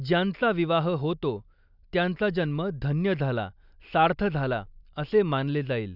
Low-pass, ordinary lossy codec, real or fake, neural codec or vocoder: 5.4 kHz; none; real; none